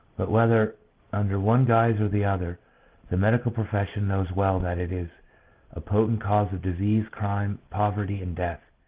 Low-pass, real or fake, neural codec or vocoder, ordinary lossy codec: 3.6 kHz; real; none; Opus, 16 kbps